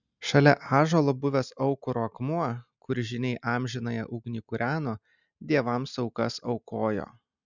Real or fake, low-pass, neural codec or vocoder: real; 7.2 kHz; none